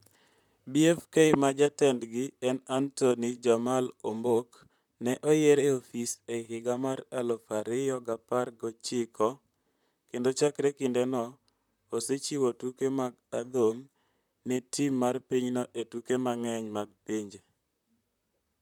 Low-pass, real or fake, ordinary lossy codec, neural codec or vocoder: 19.8 kHz; fake; none; vocoder, 44.1 kHz, 128 mel bands, Pupu-Vocoder